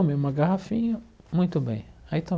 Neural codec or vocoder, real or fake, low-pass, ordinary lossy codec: none; real; none; none